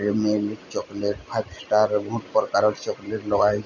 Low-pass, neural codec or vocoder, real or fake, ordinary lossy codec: 7.2 kHz; none; real; none